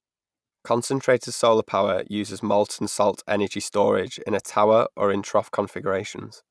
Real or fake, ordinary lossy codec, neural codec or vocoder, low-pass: real; none; none; none